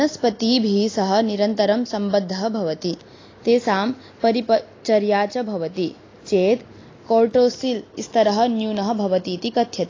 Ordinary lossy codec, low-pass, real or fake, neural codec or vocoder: AAC, 32 kbps; 7.2 kHz; real; none